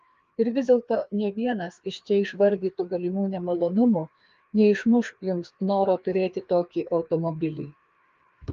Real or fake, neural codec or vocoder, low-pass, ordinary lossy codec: fake; codec, 16 kHz, 2 kbps, FreqCodec, larger model; 7.2 kHz; Opus, 32 kbps